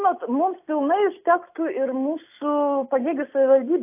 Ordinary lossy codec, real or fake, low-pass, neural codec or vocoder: AAC, 32 kbps; real; 3.6 kHz; none